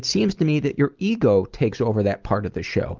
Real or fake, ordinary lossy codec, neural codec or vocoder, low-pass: real; Opus, 32 kbps; none; 7.2 kHz